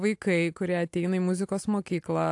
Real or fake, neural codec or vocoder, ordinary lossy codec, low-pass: real; none; AAC, 64 kbps; 10.8 kHz